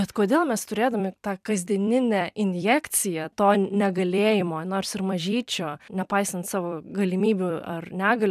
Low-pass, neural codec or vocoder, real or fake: 14.4 kHz; vocoder, 44.1 kHz, 128 mel bands every 256 samples, BigVGAN v2; fake